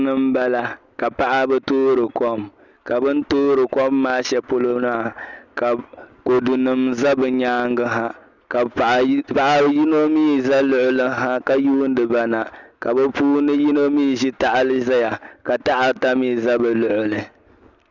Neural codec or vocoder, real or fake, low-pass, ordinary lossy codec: none; real; 7.2 kHz; Opus, 64 kbps